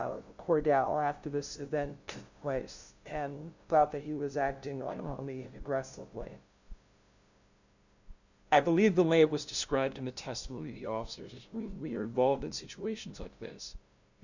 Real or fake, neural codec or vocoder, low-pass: fake; codec, 16 kHz, 0.5 kbps, FunCodec, trained on LibriTTS, 25 frames a second; 7.2 kHz